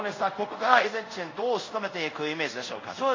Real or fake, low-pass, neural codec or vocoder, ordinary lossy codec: fake; 7.2 kHz; codec, 24 kHz, 0.5 kbps, DualCodec; MP3, 32 kbps